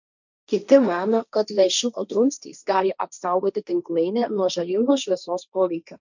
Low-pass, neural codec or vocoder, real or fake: 7.2 kHz; codec, 16 kHz, 1.1 kbps, Voila-Tokenizer; fake